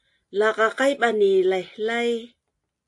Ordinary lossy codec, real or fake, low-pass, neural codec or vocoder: AAC, 64 kbps; real; 10.8 kHz; none